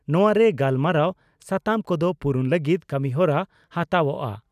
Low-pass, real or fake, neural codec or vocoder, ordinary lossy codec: 14.4 kHz; real; none; none